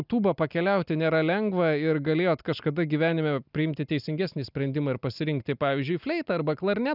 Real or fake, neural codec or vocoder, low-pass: real; none; 5.4 kHz